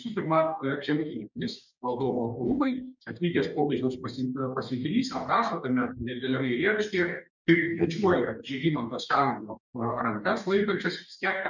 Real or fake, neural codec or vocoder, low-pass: fake; codec, 44.1 kHz, 2.6 kbps, DAC; 7.2 kHz